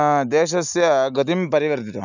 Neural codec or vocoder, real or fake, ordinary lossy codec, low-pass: none; real; none; 7.2 kHz